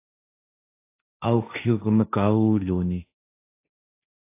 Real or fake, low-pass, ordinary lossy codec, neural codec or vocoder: fake; 3.6 kHz; AAC, 24 kbps; codec, 16 kHz, 1.1 kbps, Voila-Tokenizer